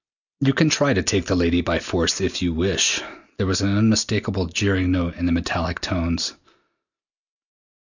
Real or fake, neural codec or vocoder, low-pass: real; none; 7.2 kHz